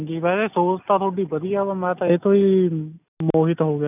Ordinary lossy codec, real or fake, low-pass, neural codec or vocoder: none; real; 3.6 kHz; none